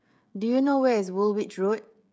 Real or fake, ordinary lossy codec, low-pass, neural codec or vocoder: fake; none; none; codec, 16 kHz, 16 kbps, FreqCodec, smaller model